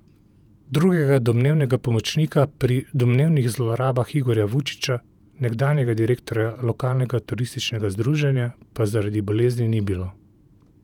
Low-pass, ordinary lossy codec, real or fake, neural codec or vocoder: 19.8 kHz; none; fake; vocoder, 48 kHz, 128 mel bands, Vocos